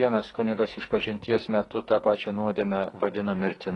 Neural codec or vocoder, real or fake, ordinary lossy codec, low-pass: codec, 44.1 kHz, 2.6 kbps, SNAC; fake; AAC, 32 kbps; 10.8 kHz